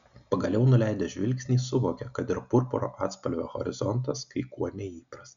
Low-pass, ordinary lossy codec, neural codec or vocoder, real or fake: 7.2 kHz; MP3, 96 kbps; none; real